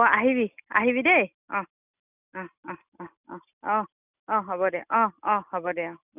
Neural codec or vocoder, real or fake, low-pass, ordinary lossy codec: none; real; 3.6 kHz; none